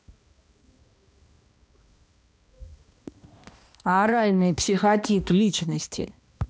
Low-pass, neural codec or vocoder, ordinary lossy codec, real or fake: none; codec, 16 kHz, 1 kbps, X-Codec, HuBERT features, trained on balanced general audio; none; fake